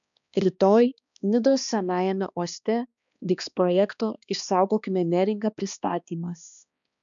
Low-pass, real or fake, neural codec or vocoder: 7.2 kHz; fake; codec, 16 kHz, 2 kbps, X-Codec, HuBERT features, trained on balanced general audio